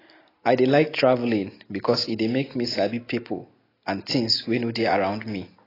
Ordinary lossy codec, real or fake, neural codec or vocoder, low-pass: AAC, 24 kbps; real; none; 5.4 kHz